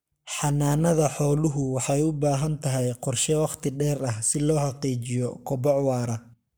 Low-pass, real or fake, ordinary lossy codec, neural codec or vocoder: none; fake; none; codec, 44.1 kHz, 7.8 kbps, Pupu-Codec